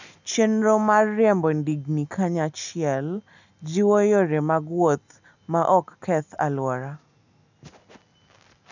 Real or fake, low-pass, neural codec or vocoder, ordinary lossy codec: real; 7.2 kHz; none; none